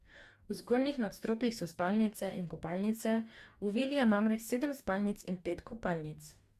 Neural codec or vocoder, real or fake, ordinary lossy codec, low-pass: codec, 44.1 kHz, 2.6 kbps, DAC; fake; Opus, 64 kbps; 14.4 kHz